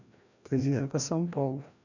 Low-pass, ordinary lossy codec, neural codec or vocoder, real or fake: 7.2 kHz; none; codec, 16 kHz, 1 kbps, FreqCodec, larger model; fake